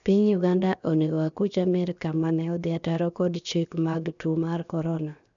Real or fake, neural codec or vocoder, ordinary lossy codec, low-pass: fake; codec, 16 kHz, 0.7 kbps, FocalCodec; none; 7.2 kHz